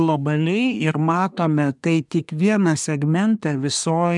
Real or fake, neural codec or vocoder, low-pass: fake; codec, 24 kHz, 1 kbps, SNAC; 10.8 kHz